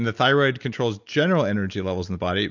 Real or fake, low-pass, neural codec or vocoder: real; 7.2 kHz; none